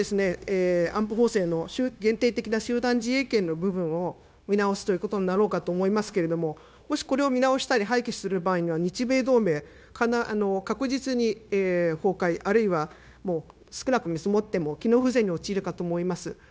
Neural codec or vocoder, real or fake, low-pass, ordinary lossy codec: codec, 16 kHz, 0.9 kbps, LongCat-Audio-Codec; fake; none; none